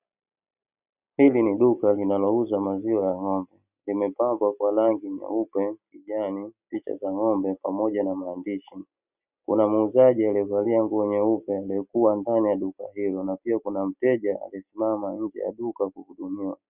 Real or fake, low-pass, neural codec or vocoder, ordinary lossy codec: real; 3.6 kHz; none; Opus, 64 kbps